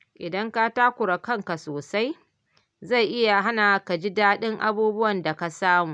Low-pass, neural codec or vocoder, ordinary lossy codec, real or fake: 9.9 kHz; none; none; real